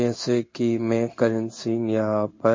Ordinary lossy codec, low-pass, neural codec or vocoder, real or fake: MP3, 32 kbps; 7.2 kHz; codec, 16 kHz in and 24 kHz out, 1 kbps, XY-Tokenizer; fake